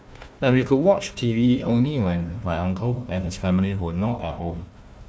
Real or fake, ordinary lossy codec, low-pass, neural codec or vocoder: fake; none; none; codec, 16 kHz, 1 kbps, FunCodec, trained on Chinese and English, 50 frames a second